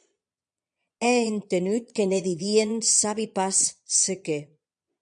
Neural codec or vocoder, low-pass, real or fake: vocoder, 22.05 kHz, 80 mel bands, Vocos; 9.9 kHz; fake